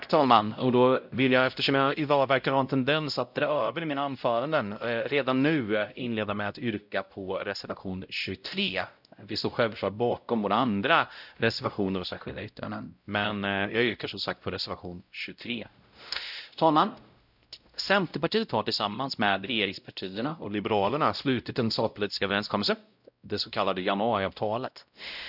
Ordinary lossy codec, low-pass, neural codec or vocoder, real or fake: none; 5.4 kHz; codec, 16 kHz, 0.5 kbps, X-Codec, WavLM features, trained on Multilingual LibriSpeech; fake